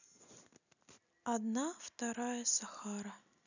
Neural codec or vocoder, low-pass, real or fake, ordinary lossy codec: none; 7.2 kHz; real; none